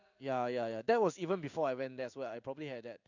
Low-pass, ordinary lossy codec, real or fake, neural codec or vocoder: 7.2 kHz; none; fake; codec, 16 kHz in and 24 kHz out, 1 kbps, XY-Tokenizer